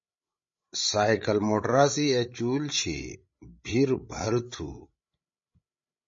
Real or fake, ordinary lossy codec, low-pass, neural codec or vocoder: fake; MP3, 32 kbps; 7.2 kHz; codec, 16 kHz, 16 kbps, FreqCodec, larger model